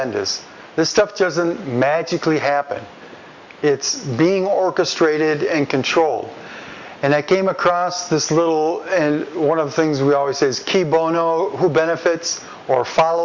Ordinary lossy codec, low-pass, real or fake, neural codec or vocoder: Opus, 64 kbps; 7.2 kHz; real; none